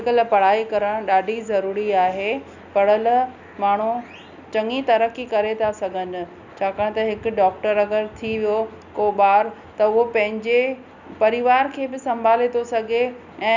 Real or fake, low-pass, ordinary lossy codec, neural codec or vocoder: real; 7.2 kHz; none; none